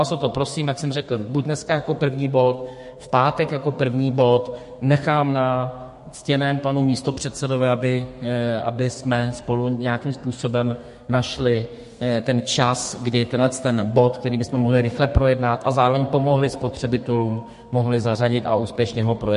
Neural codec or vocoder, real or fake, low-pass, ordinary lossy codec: codec, 32 kHz, 1.9 kbps, SNAC; fake; 14.4 kHz; MP3, 48 kbps